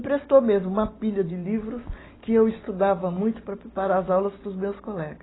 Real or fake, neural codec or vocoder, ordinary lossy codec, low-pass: real; none; AAC, 16 kbps; 7.2 kHz